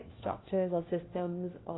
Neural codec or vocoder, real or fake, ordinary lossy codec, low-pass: codec, 16 kHz, 1 kbps, FunCodec, trained on LibriTTS, 50 frames a second; fake; AAC, 16 kbps; 7.2 kHz